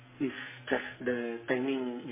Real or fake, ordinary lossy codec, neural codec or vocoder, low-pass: fake; MP3, 16 kbps; codec, 44.1 kHz, 2.6 kbps, SNAC; 3.6 kHz